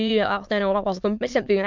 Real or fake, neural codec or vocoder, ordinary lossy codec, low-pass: fake; autoencoder, 22.05 kHz, a latent of 192 numbers a frame, VITS, trained on many speakers; MP3, 64 kbps; 7.2 kHz